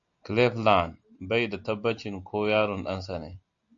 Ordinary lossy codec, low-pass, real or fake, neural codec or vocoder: AAC, 64 kbps; 7.2 kHz; real; none